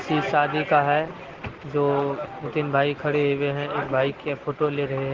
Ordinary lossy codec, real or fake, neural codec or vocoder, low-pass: Opus, 16 kbps; real; none; 7.2 kHz